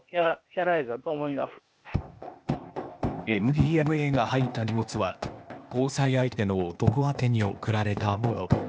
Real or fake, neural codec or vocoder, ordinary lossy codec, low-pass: fake; codec, 16 kHz, 0.8 kbps, ZipCodec; none; none